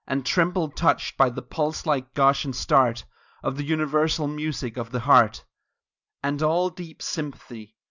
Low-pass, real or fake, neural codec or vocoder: 7.2 kHz; real; none